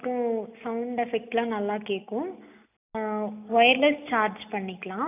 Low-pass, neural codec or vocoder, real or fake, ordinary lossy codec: 3.6 kHz; none; real; none